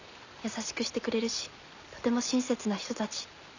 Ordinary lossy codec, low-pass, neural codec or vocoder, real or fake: none; 7.2 kHz; none; real